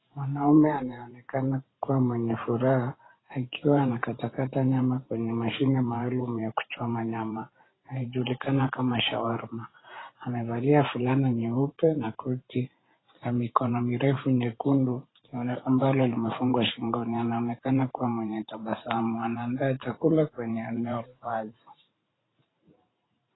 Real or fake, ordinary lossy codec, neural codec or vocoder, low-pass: fake; AAC, 16 kbps; vocoder, 44.1 kHz, 128 mel bands every 512 samples, BigVGAN v2; 7.2 kHz